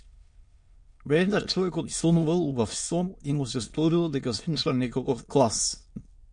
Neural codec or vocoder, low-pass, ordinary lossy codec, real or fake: autoencoder, 22.05 kHz, a latent of 192 numbers a frame, VITS, trained on many speakers; 9.9 kHz; MP3, 48 kbps; fake